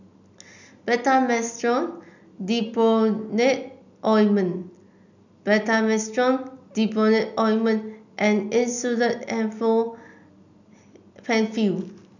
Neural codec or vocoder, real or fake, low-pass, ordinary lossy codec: none; real; 7.2 kHz; none